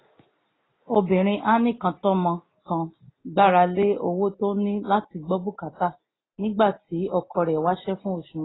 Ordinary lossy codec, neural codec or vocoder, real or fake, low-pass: AAC, 16 kbps; none; real; 7.2 kHz